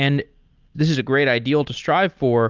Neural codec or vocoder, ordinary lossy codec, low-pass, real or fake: none; Opus, 24 kbps; 7.2 kHz; real